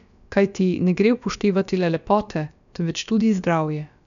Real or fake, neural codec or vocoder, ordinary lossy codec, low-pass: fake; codec, 16 kHz, about 1 kbps, DyCAST, with the encoder's durations; none; 7.2 kHz